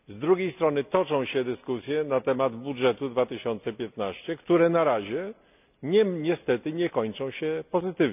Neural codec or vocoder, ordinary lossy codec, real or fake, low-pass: none; none; real; 3.6 kHz